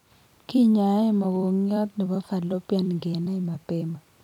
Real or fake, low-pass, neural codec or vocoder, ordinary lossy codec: fake; 19.8 kHz; vocoder, 44.1 kHz, 128 mel bands every 256 samples, BigVGAN v2; none